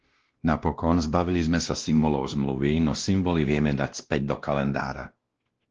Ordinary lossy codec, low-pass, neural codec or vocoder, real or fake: Opus, 16 kbps; 7.2 kHz; codec, 16 kHz, 1 kbps, X-Codec, WavLM features, trained on Multilingual LibriSpeech; fake